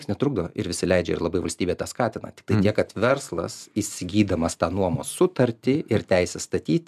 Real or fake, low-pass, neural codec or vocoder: real; 14.4 kHz; none